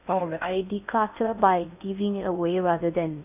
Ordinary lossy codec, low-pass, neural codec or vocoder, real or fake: none; 3.6 kHz; codec, 16 kHz in and 24 kHz out, 0.6 kbps, FocalCodec, streaming, 2048 codes; fake